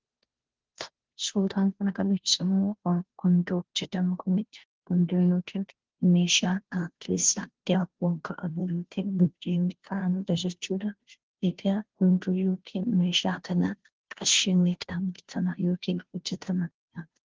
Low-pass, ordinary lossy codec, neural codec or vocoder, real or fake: 7.2 kHz; Opus, 16 kbps; codec, 16 kHz, 0.5 kbps, FunCodec, trained on Chinese and English, 25 frames a second; fake